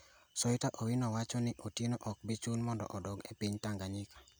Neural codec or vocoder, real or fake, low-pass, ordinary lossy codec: none; real; none; none